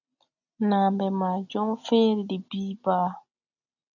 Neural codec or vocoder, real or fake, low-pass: none; real; 7.2 kHz